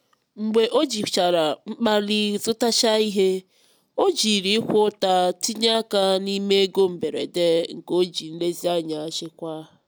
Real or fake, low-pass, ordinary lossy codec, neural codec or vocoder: real; none; none; none